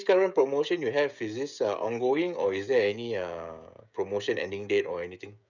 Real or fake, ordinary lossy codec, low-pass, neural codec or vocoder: fake; none; 7.2 kHz; codec, 16 kHz, 16 kbps, FreqCodec, smaller model